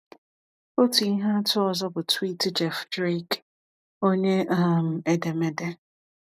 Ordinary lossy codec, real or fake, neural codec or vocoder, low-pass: none; real; none; 14.4 kHz